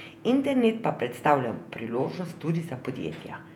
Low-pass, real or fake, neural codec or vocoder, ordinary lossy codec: 19.8 kHz; fake; vocoder, 48 kHz, 128 mel bands, Vocos; none